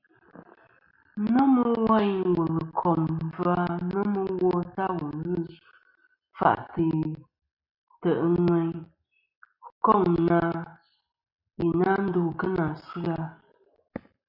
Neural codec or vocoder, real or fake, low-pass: none; real; 5.4 kHz